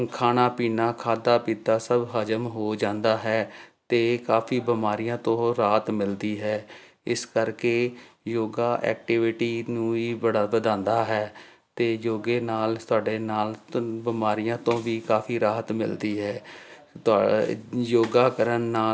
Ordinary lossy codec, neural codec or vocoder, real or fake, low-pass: none; none; real; none